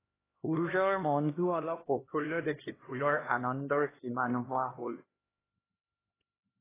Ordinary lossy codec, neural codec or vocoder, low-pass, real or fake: AAC, 16 kbps; codec, 16 kHz, 1 kbps, X-Codec, HuBERT features, trained on LibriSpeech; 3.6 kHz; fake